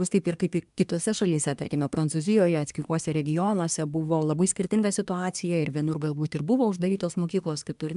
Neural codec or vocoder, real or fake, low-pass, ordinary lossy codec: codec, 24 kHz, 1 kbps, SNAC; fake; 10.8 kHz; AAC, 96 kbps